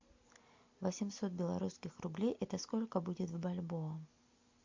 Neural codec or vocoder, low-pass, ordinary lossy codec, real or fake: none; 7.2 kHz; MP3, 48 kbps; real